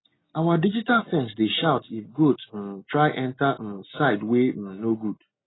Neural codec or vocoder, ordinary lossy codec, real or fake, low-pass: none; AAC, 16 kbps; real; 7.2 kHz